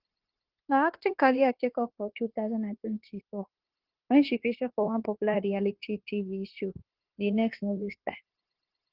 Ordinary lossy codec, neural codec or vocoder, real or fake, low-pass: Opus, 16 kbps; codec, 16 kHz, 0.9 kbps, LongCat-Audio-Codec; fake; 5.4 kHz